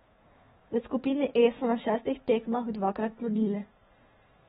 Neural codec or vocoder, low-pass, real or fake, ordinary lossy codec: autoencoder, 48 kHz, 128 numbers a frame, DAC-VAE, trained on Japanese speech; 19.8 kHz; fake; AAC, 16 kbps